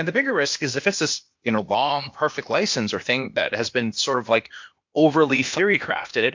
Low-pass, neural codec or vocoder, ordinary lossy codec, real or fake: 7.2 kHz; codec, 16 kHz, 0.8 kbps, ZipCodec; MP3, 48 kbps; fake